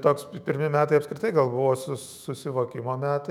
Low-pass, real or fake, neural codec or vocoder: 19.8 kHz; fake; autoencoder, 48 kHz, 128 numbers a frame, DAC-VAE, trained on Japanese speech